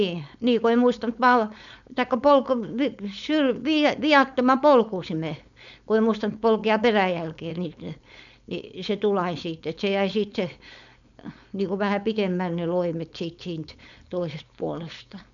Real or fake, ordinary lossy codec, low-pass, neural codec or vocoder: fake; none; 7.2 kHz; codec, 16 kHz, 4.8 kbps, FACodec